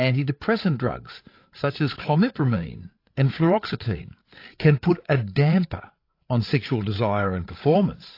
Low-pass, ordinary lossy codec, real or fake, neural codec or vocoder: 5.4 kHz; AAC, 32 kbps; fake; codec, 16 kHz, 8 kbps, FreqCodec, larger model